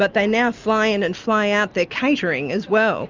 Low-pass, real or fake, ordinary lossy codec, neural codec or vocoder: 7.2 kHz; real; Opus, 32 kbps; none